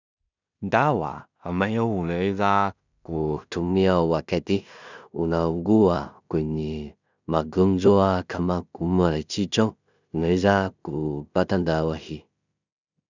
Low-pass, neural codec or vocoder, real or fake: 7.2 kHz; codec, 16 kHz in and 24 kHz out, 0.4 kbps, LongCat-Audio-Codec, two codebook decoder; fake